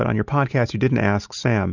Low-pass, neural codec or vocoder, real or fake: 7.2 kHz; none; real